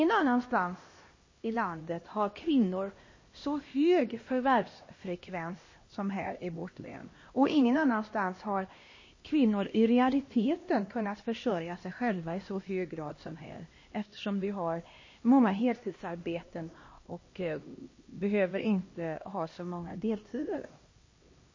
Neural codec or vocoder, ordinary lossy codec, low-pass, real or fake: codec, 16 kHz, 1 kbps, X-Codec, HuBERT features, trained on LibriSpeech; MP3, 32 kbps; 7.2 kHz; fake